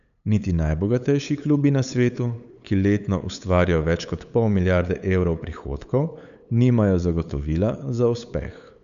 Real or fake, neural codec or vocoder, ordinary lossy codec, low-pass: fake; codec, 16 kHz, 8 kbps, FunCodec, trained on LibriTTS, 25 frames a second; none; 7.2 kHz